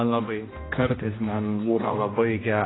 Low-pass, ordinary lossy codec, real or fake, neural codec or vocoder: 7.2 kHz; AAC, 16 kbps; fake; codec, 16 kHz, 1 kbps, X-Codec, HuBERT features, trained on general audio